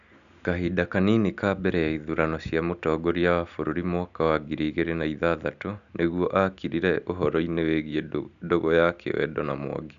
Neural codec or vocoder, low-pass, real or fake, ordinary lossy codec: none; 7.2 kHz; real; none